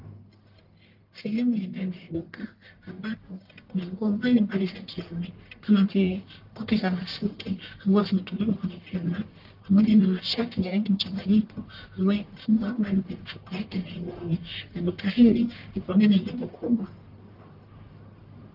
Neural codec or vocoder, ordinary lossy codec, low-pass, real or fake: codec, 44.1 kHz, 1.7 kbps, Pupu-Codec; Opus, 32 kbps; 5.4 kHz; fake